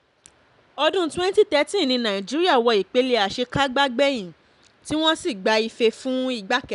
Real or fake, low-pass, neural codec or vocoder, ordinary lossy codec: real; 10.8 kHz; none; none